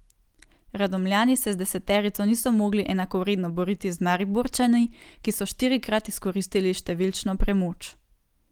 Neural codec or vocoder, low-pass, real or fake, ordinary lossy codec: none; 19.8 kHz; real; Opus, 24 kbps